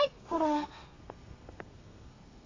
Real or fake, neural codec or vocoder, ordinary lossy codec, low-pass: fake; codec, 32 kHz, 1.9 kbps, SNAC; AAC, 32 kbps; 7.2 kHz